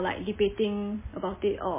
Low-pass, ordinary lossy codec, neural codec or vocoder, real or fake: 3.6 kHz; MP3, 16 kbps; none; real